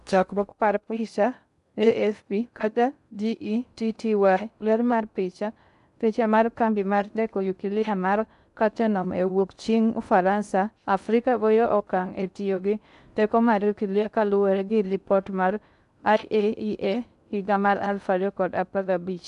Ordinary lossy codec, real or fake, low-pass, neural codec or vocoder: none; fake; 10.8 kHz; codec, 16 kHz in and 24 kHz out, 0.6 kbps, FocalCodec, streaming, 2048 codes